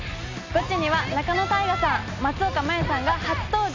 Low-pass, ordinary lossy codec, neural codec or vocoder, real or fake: 7.2 kHz; MP3, 64 kbps; none; real